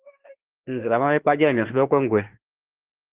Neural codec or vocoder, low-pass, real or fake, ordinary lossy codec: autoencoder, 48 kHz, 32 numbers a frame, DAC-VAE, trained on Japanese speech; 3.6 kHz; fake; Opus, 16 kbps